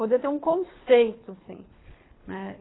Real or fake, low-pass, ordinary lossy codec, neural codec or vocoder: fake; 7.2 kHz; AAC, 16 kbps; codec, 24 kHz, 3 kbps, HILCodec